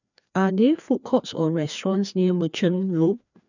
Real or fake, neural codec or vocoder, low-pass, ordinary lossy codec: fake; codec, 16 kHz, 2 kbps, FreqCodec, larger model; 7.2 kHz; none